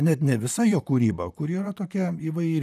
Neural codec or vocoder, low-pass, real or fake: vocoder, 44.1 kHz, 128 mel bands, Pupu-Vocoder; 14.4 kHz; fake